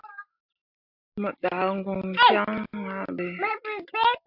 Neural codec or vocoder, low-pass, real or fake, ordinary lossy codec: none; 5.4 kHz; real; AAC, 48 kbps